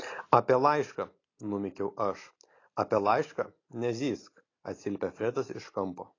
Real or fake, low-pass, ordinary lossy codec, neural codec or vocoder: real; 7.2 kHz; AAC, 32 kbps; none